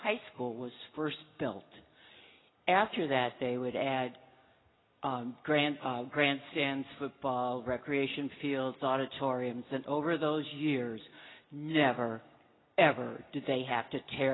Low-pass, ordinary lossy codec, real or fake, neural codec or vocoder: 7.2 kHz; AAC, 16 kbps; real; none